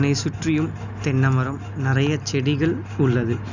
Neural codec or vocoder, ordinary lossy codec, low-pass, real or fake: none; none; 7.2 kHz; real